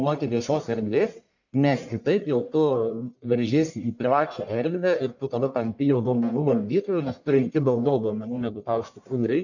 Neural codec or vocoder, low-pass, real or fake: codec, 44.1 kHz, 1.7 kbps, Pupu-Codec; 7.2 kHz; fake